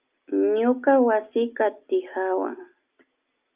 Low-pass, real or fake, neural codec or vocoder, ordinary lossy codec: 3.6 kHz; real; none; Opus, 24 kbps